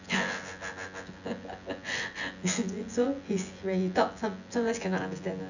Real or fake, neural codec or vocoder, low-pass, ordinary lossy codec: fake; vocoder, 24 kHz, 100 mel bands, Vocos; 7.2 kHz; none